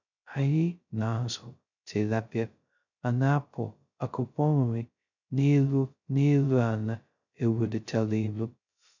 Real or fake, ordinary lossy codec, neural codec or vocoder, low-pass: fake; none; codec, 16 kHz, 0.2 kbps, FocalCodec; 7.2 kHz